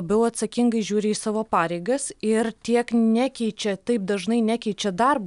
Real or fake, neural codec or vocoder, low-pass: real; none; 10.8 kHz